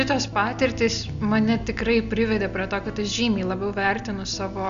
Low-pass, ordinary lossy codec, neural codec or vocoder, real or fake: 7.2 kHz; MP3, 96 kbps; none; real